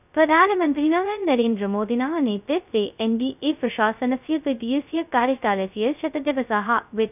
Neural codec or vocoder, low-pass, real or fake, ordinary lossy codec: codec, 16 kHz, 0.2 kbps, FocalCodec; 3.6 kHz; fake; none